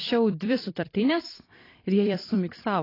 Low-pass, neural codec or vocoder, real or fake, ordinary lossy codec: 5.4 kHz; vocoder, 44.1 kHz, 128 mel bands every 256 samples, BigVGAN v2; fake; AAC, 24 kbps